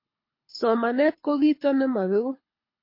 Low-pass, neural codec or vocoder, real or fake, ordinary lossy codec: 5.4 kHz; codec, 24 kHz, 6 kbps, HILCodec; fake; MP3, 32 kbps